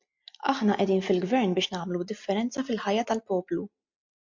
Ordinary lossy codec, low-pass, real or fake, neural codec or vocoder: MP3, 48 kbps; 7.2 kHz; real; none